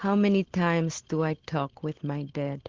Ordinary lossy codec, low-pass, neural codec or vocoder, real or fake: Opus, 16 kbps; 7.2 kHz; none; real